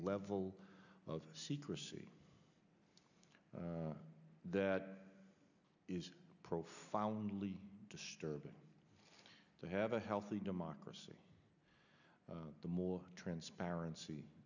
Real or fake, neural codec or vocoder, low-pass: real; none; 7.2 kHz